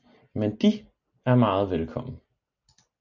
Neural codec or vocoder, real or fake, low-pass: none; real; 7.2 kHz